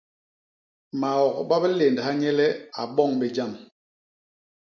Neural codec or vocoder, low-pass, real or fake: none; 7.2 kHz; real